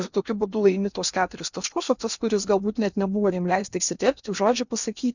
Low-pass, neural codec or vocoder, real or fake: 7.2 kHz; codec, 16 kHz in and 24 kHz out, 0.8 kbps, FocalCodec, streaming, 65536 codes; fake